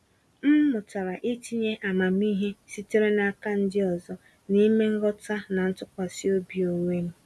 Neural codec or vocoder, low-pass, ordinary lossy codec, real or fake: none; none; none; real